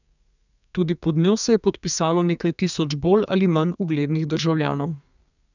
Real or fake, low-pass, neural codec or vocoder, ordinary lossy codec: fake; 7.2 kHz; codec, 32 kHz, 1.9 kbps, SNAC; none